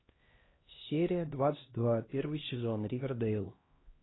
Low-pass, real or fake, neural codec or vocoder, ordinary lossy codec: 7.2 kHz; fake; codec, 16 kHz, 1 kbps, X-Codec, HuBERT features, trained on LibriSpeech; AAC, 16 kbps